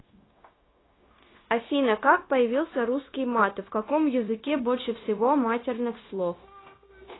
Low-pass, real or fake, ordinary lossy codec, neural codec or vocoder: 7.2 kHz; fake; AAC, 16 kbps; codec, 16 kHz, 0.9 kbps, LongCat-Audio-Codec